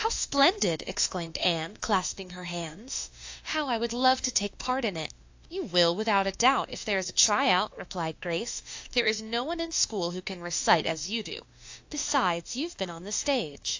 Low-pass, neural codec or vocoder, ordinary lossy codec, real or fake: 7.2 kHz; autoencoder, 48 kHz, 32 numbers a frame, DAC-VAE, trained on Japanese speech; AAC, 48 kbps; fake